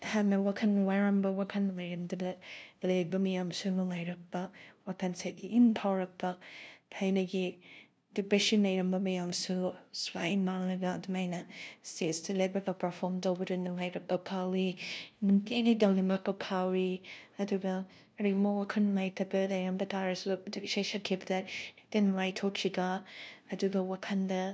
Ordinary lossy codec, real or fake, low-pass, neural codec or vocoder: none; fake; none; codec, 16 kHz, 0.5 kbps, FunCodec, trained on LibriTTS, 25 frames a second